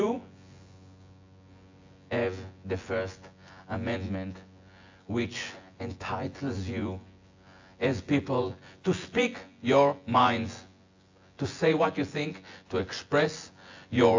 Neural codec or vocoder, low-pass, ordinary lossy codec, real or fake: vocoder, 24 kHz, 100 mel bands, Vocos; 7.2 kHz; AAC, 48 kbps; fake